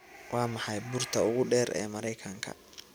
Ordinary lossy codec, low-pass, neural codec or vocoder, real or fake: none; none; none; real